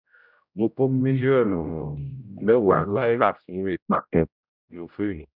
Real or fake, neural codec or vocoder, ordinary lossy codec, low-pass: fake; codec, 16 kHz, 0.5 kbps, X-Codec, HuBERT features, trained on general audio; none; 5.4 kHz